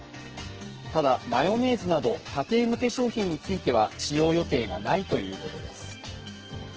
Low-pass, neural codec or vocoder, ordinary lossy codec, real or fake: 7.2 kHz; codec, 44.1 kHz, 2.6 kbps, SNAC; Opus, 16 kbps; fake